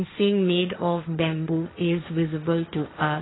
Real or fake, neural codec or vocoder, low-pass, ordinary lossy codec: fake; codec, 16 kHz, 1.1 kbps, Voila-Tokenizer; 7.2 kHz; AAC, 16 kbps